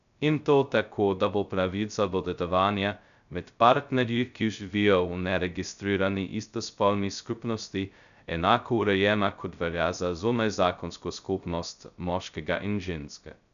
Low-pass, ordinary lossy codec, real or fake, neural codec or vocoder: 7.2 kHz; none; fake; codec, 16 kHz, 0.2 kbps, FocalCodec